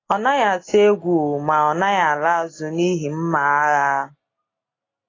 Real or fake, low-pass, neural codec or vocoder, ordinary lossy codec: real; 7.2 kHz; none; AAC, 32 kbps